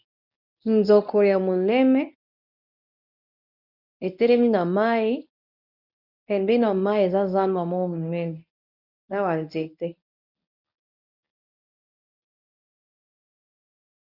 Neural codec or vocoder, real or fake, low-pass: codec, 24 kHz, 0.9 kbps, WavTokenizer, medium speech release version 1; fake; 5.4 kHz